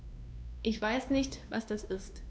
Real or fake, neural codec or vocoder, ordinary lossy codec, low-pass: fake; codec, 16 kHz, 2 kbps, X-Codec, WavLM features, trained on Multilingual LibriSpeech; none; none